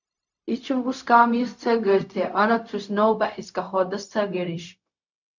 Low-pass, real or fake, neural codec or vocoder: 7.2 kHz; fake; codec, 16 kHz, 0.4 kbps, LongCat-Audio-Codec